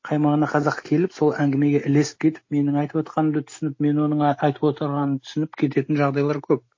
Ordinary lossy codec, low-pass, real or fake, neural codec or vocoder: MP3, 32 kbps; 7.2 kHz; real; none